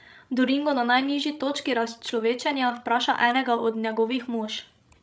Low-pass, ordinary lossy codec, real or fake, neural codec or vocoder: none; none; fake; codec, 16 kHz, 16 kbps, FreqCodec, larger model